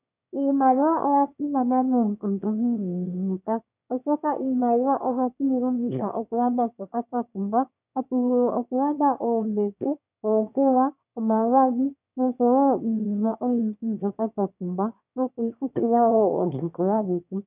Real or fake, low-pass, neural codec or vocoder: fake; 3.6 kHz; autoencoder, 22.05 kHz, a latent of 192 numbers a frame, VITS, trained on one speaker